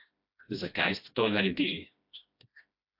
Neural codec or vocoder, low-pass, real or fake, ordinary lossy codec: codec, 16 kHz, 1 kbps, FreqCodec, smaller model; 5.4 kHz; fake; AAC, 48 kbps